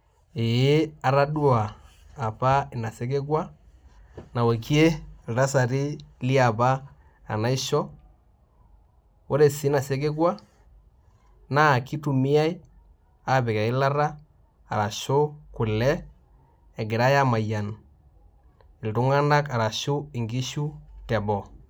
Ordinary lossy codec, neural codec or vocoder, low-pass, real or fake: none; none; none; real